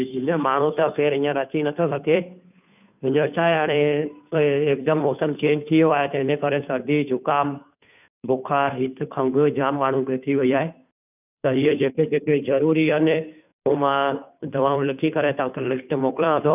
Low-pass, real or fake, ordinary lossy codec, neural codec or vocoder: 3.6 kHz; fake; none; codec, 16 kHz, 2 kbps, FunCodec, trained on Chinese and English, 25 frames a second